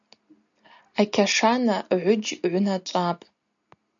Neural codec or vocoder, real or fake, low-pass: none; real; 7.2 kHz